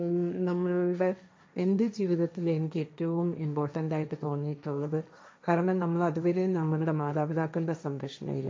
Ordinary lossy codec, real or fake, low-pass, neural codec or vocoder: none; fake; none; codec, 16 kHz, 1.1 kbps, Voila-Tokenizer